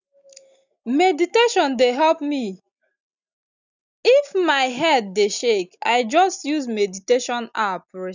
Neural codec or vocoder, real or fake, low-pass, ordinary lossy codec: none; real; 7.2 kHz; none